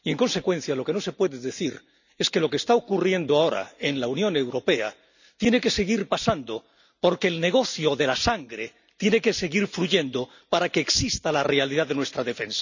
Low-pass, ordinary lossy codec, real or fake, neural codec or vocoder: 7.2 kHz; none; real; none